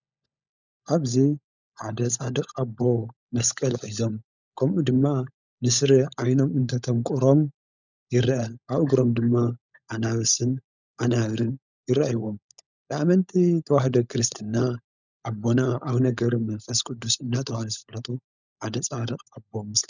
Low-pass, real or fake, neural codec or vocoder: 7.2 kHz; fake; codec, 16 kHz, 16 kbps, FunCodec, trained on LibriTTS, 50 frames a second